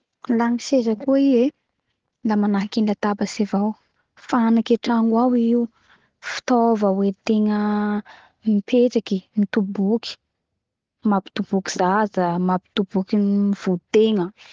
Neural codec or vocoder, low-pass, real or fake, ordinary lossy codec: none; 7.2 kHz; real; Opus, 16 kbps